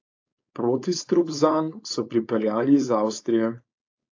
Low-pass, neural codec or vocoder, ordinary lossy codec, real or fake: 7.2 kHz; codec, 16 kHz, 4.8 kbps, FACodec; AAC, 48 kbps; fake